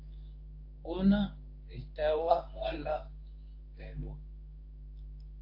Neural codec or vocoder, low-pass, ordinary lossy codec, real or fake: codec, 24 kHz, 0.9 kbps, WavTokenizer, medium speech release version 1; 5.4 kHz; AAC, 32 kbps; fake